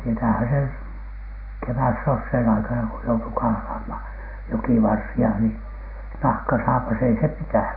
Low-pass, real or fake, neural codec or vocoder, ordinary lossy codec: 5.4 kHz; real; none; none